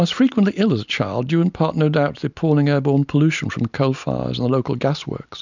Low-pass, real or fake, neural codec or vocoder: 7.2 kHz; real; none